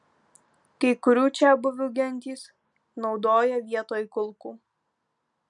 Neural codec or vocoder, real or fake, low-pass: none; real; 10.8 kHz